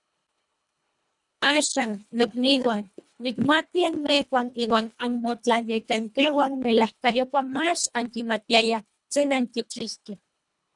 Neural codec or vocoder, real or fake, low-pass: codec, 24 kHz, 1.5 kbps, HILCodec; fake; 10.8 kHz